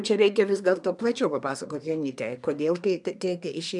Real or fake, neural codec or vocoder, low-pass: fake; codec, 24 kHz, 1 kbps, SNAC; 10.8 kHz